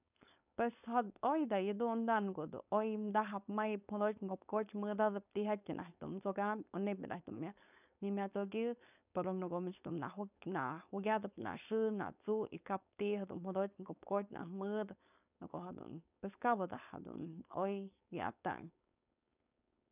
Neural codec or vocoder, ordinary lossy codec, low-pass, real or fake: codec, 16 kHz, 4.8 kbps, FACodec; none; 3.6 kHz; fake